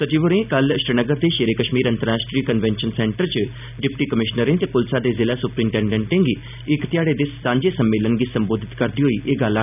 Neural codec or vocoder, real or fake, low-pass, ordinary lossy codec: none; real; 3.6 kHz; none